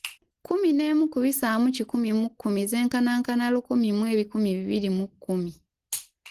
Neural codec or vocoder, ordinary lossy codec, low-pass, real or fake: none; Opus, 16 kbps; 14.4 kHz; real